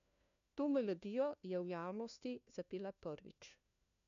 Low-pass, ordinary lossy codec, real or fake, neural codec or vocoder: 7.2 kHz; AAC, 96 kbps; fake; codec, 16 kHz, 1 kbps, FunCodec, trained on LibriTTS, 50 frames a second